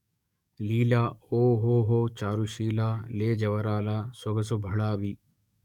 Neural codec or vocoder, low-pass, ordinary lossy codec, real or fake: codec, 44.1 kHz, 7.8 kbps, DAC; 19.8 kHz; none; fake